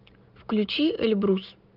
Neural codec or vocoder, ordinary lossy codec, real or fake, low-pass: none; Opus, 24 kbps; real; 5.4 kHz